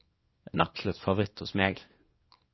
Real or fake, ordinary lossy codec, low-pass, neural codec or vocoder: fake; MP3, 24 kbps; 7.2 kHz; codec, 24 kHz, 0.9 kbps, WavTokenizer, small release